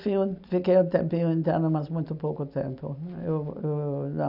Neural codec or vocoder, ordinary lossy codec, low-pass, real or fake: none; none; 5.4 kHz; real